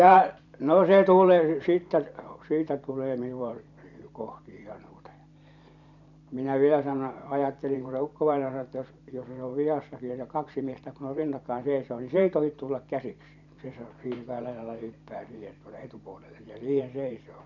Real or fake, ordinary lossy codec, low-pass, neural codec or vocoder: fake; none; 7.2 kHz; vocoder, 44.1 kHz, 128 mel bands every 512 samples, BigVGAN v2